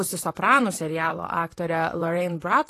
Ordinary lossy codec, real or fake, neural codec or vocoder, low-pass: AAC, 48 kbps; fake; vocoder, 44.1 kHz, 128 mel bands, Pupu-Vocoder; 14.4 kHz